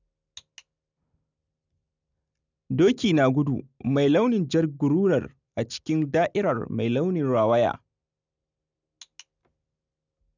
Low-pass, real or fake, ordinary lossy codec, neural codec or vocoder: 7.2 kHz; real; none; none